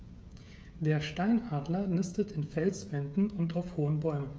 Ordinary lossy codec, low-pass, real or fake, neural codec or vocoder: none; none; fake; codec, 16 kHz, 16 kbps, FreqCodec, smaller model